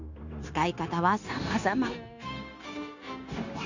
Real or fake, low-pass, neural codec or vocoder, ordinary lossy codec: fake; 7.2 kHz; codec, 16 kHz, 0.9 kbps, LongCat-Audio-Codec; none